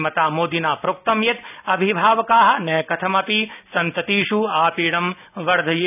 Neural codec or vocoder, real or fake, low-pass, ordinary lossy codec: none; real; 3.6 kHz; none